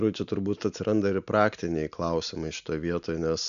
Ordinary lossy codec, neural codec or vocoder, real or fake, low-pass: MP3, 96 kbps; none; real; 7.2 kHz